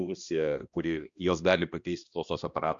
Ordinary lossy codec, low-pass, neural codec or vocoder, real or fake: Opus, 64 kbps; 7.2 kHz; codec, 16 kHz, 1 kbps, X-Codec, HuBERT features, trained on balanced general audio; fake